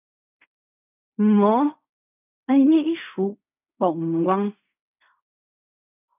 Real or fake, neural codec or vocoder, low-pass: fake; codec, 16 kHz in and 24 kHz out, 0.4 kbps, LongCat-Audio-Codec, fine tuned four codebook decoder; 3.6 kHz